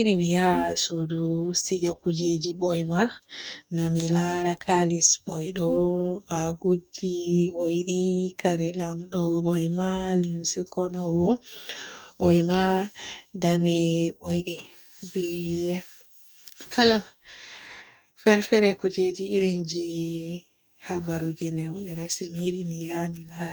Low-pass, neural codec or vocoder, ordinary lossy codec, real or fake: none; codec, 44.1 kHz, 2.6 kbps, DAC; none; fake